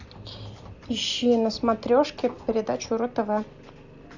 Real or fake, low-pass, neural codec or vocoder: real; 7.2 kHz; none